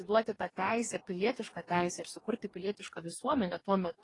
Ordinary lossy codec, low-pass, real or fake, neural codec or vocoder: AAC, 32 kbps; 10.8 kHz; fake; codec, 44.1 kHz, 2.6 kbps, DAC